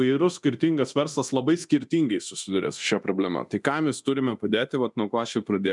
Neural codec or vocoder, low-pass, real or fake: codec, 24 kHz, 0.9 kbps, DualCodec; 10.8 kHz; fake